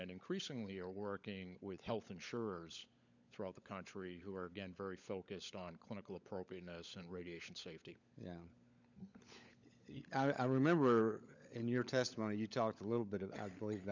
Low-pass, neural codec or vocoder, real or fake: 7.2 kHz; codec, 16 kHz, 16 kbps, FunCodec, trained on LibriTTS, 50 frames a second; fake